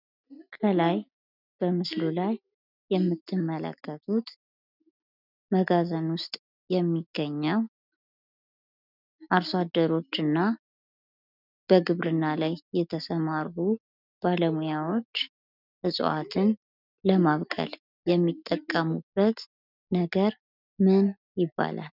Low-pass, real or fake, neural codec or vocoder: 5.4 kHz; real; none